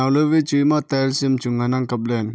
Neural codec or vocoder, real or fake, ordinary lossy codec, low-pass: none; real; none; none